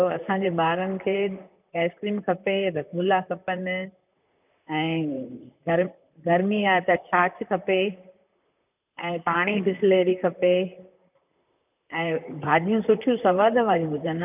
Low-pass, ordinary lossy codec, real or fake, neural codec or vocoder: 3.6 kHz; none; fake; vocoder, 44.1 kHz, 128 mel bands, Pupu-Vocoder